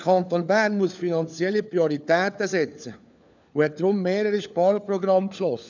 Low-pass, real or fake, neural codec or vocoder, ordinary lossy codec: 7.2 kHz; fake; codec, 16 kHz, 4 kbps, FunCodec, trained on LibriTTS, 50 frames a second; none